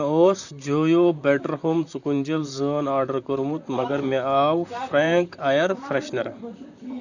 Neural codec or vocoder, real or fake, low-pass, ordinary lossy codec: vocoder, 44.1 kHz, 128 mel bands, Pupu-Vocoder; fake; 7.2 kHz; none